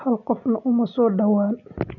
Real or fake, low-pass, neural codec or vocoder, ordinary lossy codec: real; 7.2 kHz; none; none